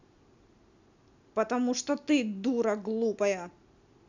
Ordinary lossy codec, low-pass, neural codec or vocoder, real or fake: none; 7.2 kHz; none; real